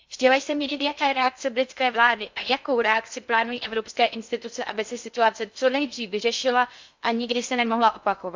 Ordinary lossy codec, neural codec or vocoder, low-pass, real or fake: MP3, 64 kbps; codec, 16 kHz in and 24 kHz out, 0.6 kbps, FocalCodec, streaming, 2048 codes; 7.2 kHz; fake